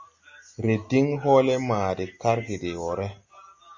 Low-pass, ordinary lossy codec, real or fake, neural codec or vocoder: 7.2 kHz; MP3, 64 kbps; real; none